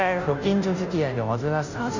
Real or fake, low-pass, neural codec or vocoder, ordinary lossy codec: fake; 7.2 kHz; codec, 16 kHz, 0.5 kbps, FunCodec, trained on Chinese and English, 25 frames a second; none